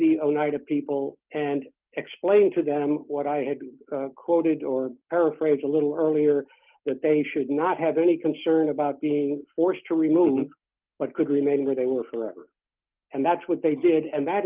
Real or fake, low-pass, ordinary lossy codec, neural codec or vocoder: real; 3.6 kHz; Opus, 32 kbps; none